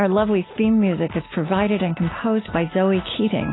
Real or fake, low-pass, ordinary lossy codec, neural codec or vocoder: real; 7.2 kHz; AAC, 16 kbps; none